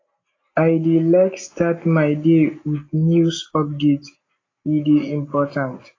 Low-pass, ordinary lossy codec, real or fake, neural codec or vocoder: 7.2 kHz; AAC, 32 kbps; real; none